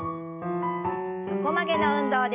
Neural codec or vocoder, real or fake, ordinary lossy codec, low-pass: none; real; none; 3.6 kHz